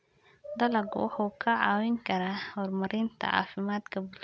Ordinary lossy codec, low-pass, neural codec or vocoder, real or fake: none; none; none; real